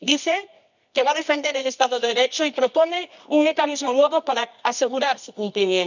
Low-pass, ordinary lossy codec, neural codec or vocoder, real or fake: 7.2 kHz; none; codec, 24 kHz, 0.9 kbps, WavTokenizer, medium music audio release; fake